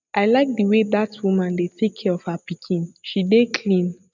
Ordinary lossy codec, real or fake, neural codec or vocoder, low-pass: none; real; none; 7.2 kHz